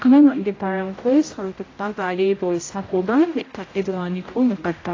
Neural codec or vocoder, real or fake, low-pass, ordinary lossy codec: codec, 16 kHz, 0.5 kbps, X-Codec, HuBERT features, trained on general audio; fake; 7.2 kHz; AAC, 32 kbps